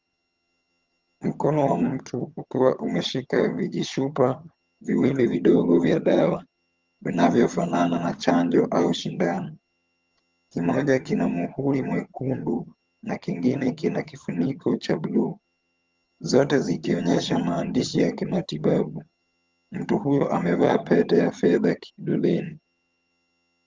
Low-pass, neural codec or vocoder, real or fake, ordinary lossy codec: 7.2 kHz; vocoder, 22.05 kHz, 80 mel bands, HiFi-GAN; fake; Opus, 32 kbps